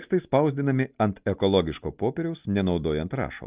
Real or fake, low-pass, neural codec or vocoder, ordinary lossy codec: real; 3.6 kHz; none; Opus, 24 kbps